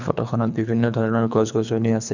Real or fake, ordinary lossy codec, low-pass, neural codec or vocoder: fake; none; 7.2 kHz; codec, 24 kHz, 3 kbps, HILCodec